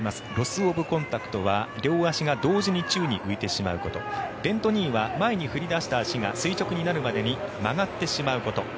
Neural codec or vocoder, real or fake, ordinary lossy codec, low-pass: none; real; none; none